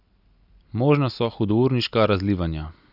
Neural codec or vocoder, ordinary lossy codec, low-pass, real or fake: none; none; 5.4 kHz; real